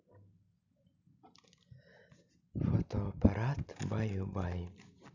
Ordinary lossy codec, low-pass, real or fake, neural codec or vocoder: none; 7.2 kHz; real; none